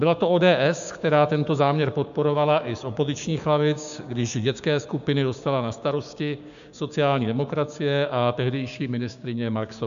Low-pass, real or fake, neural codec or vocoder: 7.2 kHz; fake; codec, 16 kHz, 6 kbps, DAC